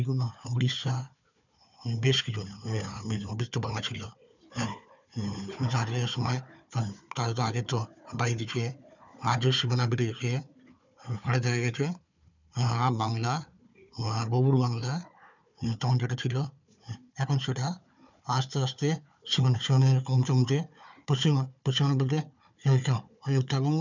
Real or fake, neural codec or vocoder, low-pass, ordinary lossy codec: fake; codec, 16 kHz, 4 kbps, FunCodec, trained on Chinese and English, 50 frames a second; 7.2 kHz; none